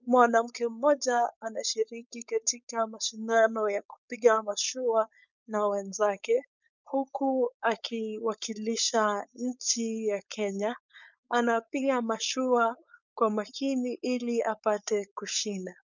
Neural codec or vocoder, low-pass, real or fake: codec, 16 kHz, 4.8 kbps, FACodec; 7.2 kHz; fake